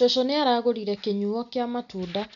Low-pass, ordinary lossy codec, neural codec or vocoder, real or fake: 7.2 kHz; none; none; real